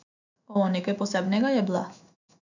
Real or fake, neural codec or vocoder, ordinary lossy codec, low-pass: fake; autoencoder, 48 kHz, 128 numbers a frame, DAC-VAE, trained on Japanese speech; none; 7.2 kHz